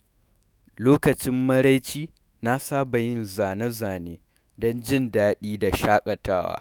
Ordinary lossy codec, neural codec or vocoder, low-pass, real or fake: none; autoencoder, 48 kHz, 128 numbers a frame, DAC-VAE, trained on Japanese speech; none; fake